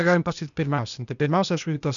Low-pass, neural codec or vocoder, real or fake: 7.2 kHz; codec, 16 kHz, 0.8 kbps, ZipCodec; fake